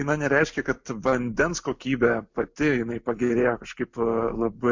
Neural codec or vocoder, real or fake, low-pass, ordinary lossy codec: none; real; 7.2 kHz; MP3, 48 kbps